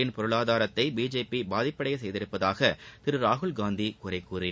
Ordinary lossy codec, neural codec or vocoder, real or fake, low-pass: none; none; real; none